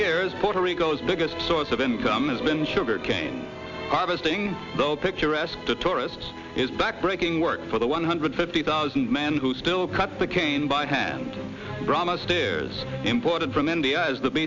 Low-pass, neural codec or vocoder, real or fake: 7.2 kHz; none; real